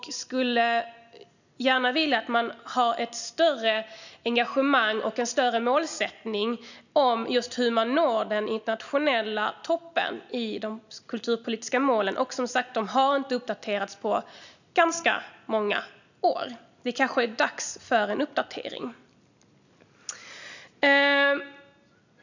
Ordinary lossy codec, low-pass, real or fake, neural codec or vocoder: none; 7.2 kHz; real; none